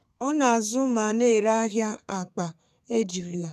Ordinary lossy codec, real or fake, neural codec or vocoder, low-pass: none; fake; codec, 32 kHz, 1.9 kbps, SNAC; 14.4 kHz